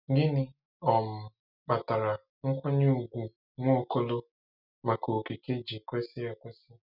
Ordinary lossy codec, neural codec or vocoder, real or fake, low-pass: none; none; real; 5.4 kHz